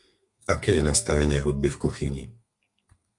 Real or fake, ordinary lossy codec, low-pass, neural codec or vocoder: fake; Opus, 64 kbps; 10.8 kHz; codec, 32 kHz, 1.9 kbps, SNAC